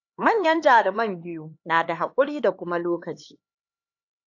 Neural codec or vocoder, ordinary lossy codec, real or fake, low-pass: codec, 16 kHz, 4 kbps, X-Codec, HuBERT features, trained on LibriSpeech; AAC, 48 kbps; fake; 7.2 kHz